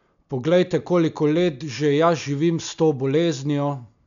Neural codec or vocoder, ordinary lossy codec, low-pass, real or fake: none; none; 7.2 kHz; real